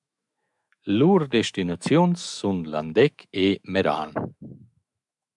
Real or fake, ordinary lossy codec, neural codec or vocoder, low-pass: fake; MP3, 96 kbps; autoencoder, 48 kHz, 128 numbers a frame, DAC-VAE, trained on Japanese speech; 10.8 kHz